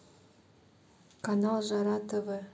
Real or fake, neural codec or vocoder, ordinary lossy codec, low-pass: real; none; none; none